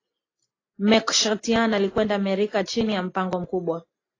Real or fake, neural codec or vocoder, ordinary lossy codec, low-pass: real; none; AAC, 32 kbps; 7.2 kHz